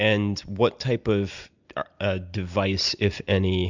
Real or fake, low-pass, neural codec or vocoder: real; 7.2 kHz; none